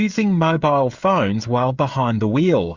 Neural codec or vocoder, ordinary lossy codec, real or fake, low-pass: codec, 16 kHz, 16 kbps, FreqCodec, smaller model; Opus, 64 kbps; fake; 7.2 kHz